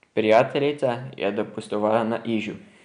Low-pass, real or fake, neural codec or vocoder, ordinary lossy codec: 9.9 kHz; real; none; none